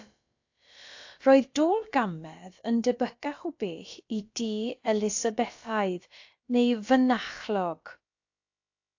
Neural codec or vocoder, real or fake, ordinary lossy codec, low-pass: codec, 16 kHz, about 1 kbps, DyCAST, with the encoder's durations; fake; AAC, 48 kbps; 7.2 kHz